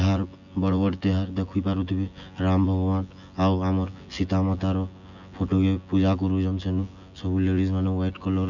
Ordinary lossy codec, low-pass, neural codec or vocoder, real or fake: none; 7.2 kHz; vocoder, 24 kHz, 100 mel bands, Vocos; fake